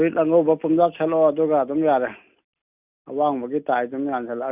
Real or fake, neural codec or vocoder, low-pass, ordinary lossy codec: real; none; 3.6 kHz; none